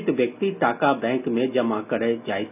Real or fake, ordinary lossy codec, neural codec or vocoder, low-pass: real; none; none; 3.6 kHz